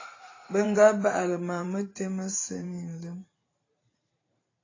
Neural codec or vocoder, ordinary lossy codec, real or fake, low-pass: vocoder, 44.1 kHz, 128 mel bands every 512 samples, BigVGAN v2; AAC, 32 kbps; fake; 7.2 kHz